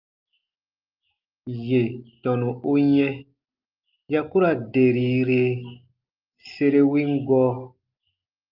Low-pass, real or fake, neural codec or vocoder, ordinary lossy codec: 5.4 kHz; real; none; Opus, 32 kbps